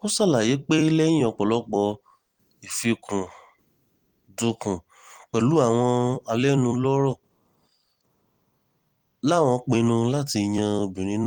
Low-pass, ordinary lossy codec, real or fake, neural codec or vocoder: 19.8 kHz; Opus, 24 kbps; fake; vocoder, 44.1 kHz, 128 mel bands every 256 samples, BigVGAN v2